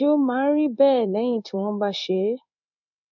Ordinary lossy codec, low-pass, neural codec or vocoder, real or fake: none; 7.2 kHz; codec, 16 kHz in and 24 kHz out, 1 kbps, XY-Tokenizer; fake